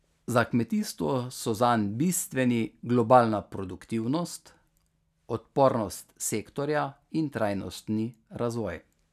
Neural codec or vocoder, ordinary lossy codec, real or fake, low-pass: none; none; real; 14.4 kHz